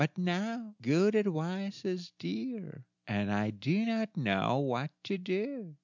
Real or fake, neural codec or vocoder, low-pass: real; none; 7.2 kHz